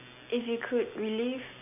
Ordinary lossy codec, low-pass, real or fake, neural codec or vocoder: none; 3.6 kHz; real; none